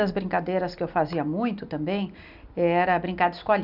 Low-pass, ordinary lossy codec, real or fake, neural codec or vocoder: 5.4 kHz; none; real; none